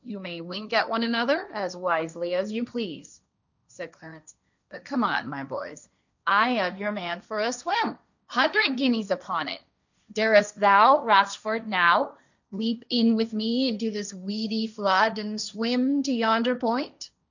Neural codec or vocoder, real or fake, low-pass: codec, 16 kHz, 1.1 kbps, Voila-Tokenizer; fake; 7.2 kHz